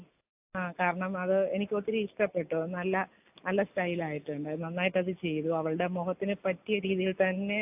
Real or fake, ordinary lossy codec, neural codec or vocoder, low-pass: real; AAC, 32 kbps; none; 3.6 kHz